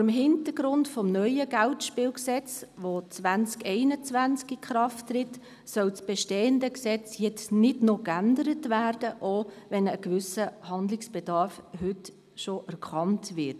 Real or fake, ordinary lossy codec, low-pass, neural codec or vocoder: real; none; 14.4 kHz; none